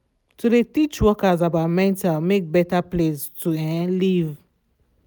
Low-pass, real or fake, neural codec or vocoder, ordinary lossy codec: none; real; none; none